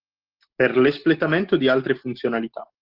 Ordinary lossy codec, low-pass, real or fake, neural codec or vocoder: Opus, 16 kbps; 5.4 kHz; real; none